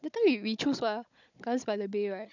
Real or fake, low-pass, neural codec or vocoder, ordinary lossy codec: fake; 7.2 kHz; codec, 16 kHz, 16 kbps, FunCodec, trained on Chinese and English, 50 frames a second; none